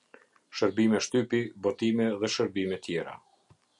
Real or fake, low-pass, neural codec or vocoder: real; 10.8 kHz; none